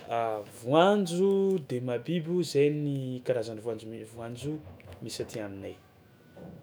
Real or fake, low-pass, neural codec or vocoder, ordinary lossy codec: fake; none; autoencoder, 48 kHz, 128 numbers a frame, DAC-VAE, trained on Japanese speech; none